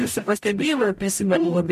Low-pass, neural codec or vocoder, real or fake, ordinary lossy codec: 14.4 kHz; codec, 44.1 kHz, 0.9 kbps, DAC; fake; MP3, 64 kbps